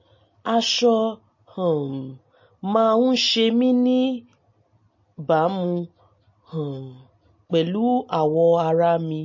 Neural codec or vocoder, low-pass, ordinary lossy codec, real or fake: none; 7.2 kHz; MP3, 32 kbps; real